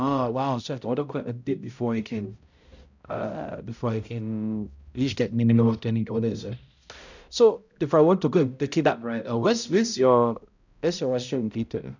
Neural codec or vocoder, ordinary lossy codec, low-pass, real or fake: codec, 16 kHz, 0.5 kbps, X-Codec, HuBERT features, trained on balanced general audio; none; 7.2 kHz; fake